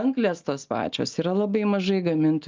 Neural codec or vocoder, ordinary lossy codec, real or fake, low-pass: none; Opus, 24 kbps; real; 7.2 kHz